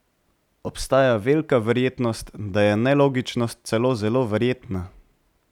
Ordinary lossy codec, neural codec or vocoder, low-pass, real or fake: none; none; 19.8 kHz; real